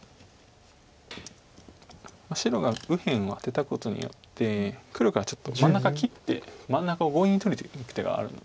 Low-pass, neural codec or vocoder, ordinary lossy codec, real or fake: none; none; none; real